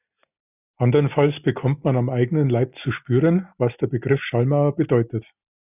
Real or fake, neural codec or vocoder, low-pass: real; none; 3.6 kHz